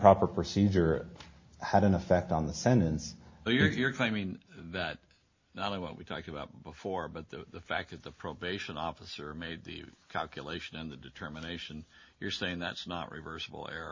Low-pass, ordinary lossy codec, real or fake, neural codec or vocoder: 7.2 kHz; MP3, 32 kbps; real; none